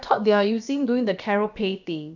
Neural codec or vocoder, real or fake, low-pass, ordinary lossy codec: codec, 16 kHz, about 1 kbps, DyCAST, with the encoder's durations; fake; 7.2 kHz; none